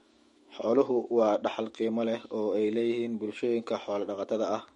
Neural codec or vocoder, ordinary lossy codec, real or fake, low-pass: autoencoder, 48 kHz, 128 numbers a frame, DAC-VAE, trained on Japanese speech; MP3, 48 kbps; fake; 19.8 kHz